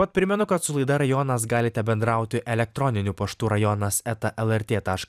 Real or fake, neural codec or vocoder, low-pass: fake; vocoder, 44.1 kHz, 128 mel bands every 256 samples, BigVGAN v2; 14.4 kHz